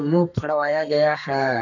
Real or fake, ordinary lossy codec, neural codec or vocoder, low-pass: fake; none; codec, 32 kHz, 1.9 kbps, SNAC; 7.2 kHz